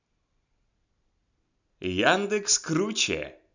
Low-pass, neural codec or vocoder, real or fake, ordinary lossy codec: 7.2 kHz; none; real; none